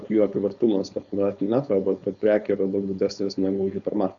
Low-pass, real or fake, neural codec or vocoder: 7.2 kHz; fake; codec, 16 kHz, 4.8 kbps, FACodec